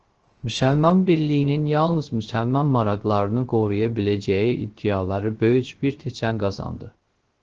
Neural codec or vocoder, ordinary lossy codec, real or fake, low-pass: codec, 16 kHz, 0.3 kbps, FocalCodec; Opus, 16 kbps; fake; 7.2 kHz